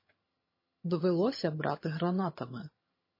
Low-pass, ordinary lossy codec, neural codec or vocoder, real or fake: 5.4 kHz; MP3, 24 kbps; vocoder, 22.05 kHz, 80 mel bands, HiFi-GAN; fake